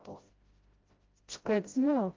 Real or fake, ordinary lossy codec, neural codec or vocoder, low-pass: fake; Opus, 32 kbps; codec, 16 kHz, 0.5 kbps, FreqCodec, smaller model; 7.2 kHz